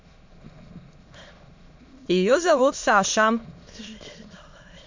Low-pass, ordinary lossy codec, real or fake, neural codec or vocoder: 7.2 kHz; MP3, 48 kbps; fake; autoencoder, 22.05 kHz, a latent of 192 numbers a frame, VITS, trained on many speakers